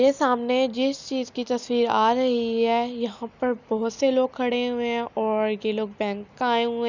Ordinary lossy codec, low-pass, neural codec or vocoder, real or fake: none; 7.2 kHz; none; real